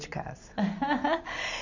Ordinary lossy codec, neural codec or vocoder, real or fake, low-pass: none; none; real; 7.2 kHz